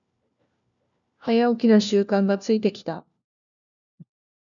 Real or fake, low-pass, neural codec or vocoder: fake; 7.2 kHz; codec, 16 kHz, 1 kbps, FunCodec, trained on LibriTTS, 50 frames a second